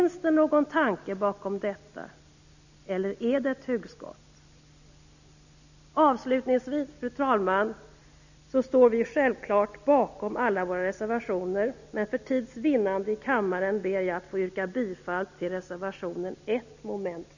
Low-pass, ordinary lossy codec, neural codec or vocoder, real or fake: 7.2 kHz; none; none; real